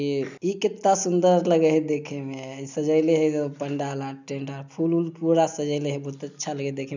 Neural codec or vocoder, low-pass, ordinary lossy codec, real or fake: none; 7.2 kHz; none; real